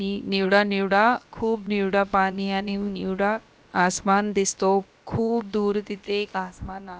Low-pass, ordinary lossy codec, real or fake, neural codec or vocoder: none; none; fake; codec, 16 kHz, about 1 kbps, DyCAST, with the encoder's durations